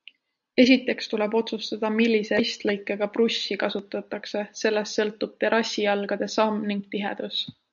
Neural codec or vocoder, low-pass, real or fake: none; 7.2 kHz; real